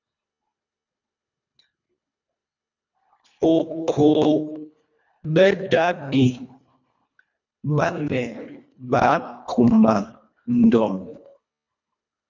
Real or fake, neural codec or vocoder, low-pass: fake; codec, 24 kHz, 1.5 kbps, HILCodec; 7.2 kHz